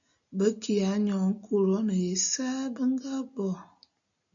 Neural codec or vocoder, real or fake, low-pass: none; real; 7.2 kHz